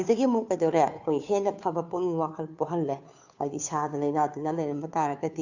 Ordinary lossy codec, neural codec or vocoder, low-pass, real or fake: none; codec, 16 kHz, 2 kbps, FunCodec, trained on Chinese and English, 25 frames a second; 7.2 kHz; fake